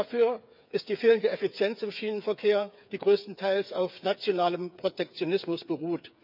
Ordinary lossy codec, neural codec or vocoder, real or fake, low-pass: none; codec, 16 kHz, 8 kbps, FreqCodec, smaller model; fake; 5.4 kHz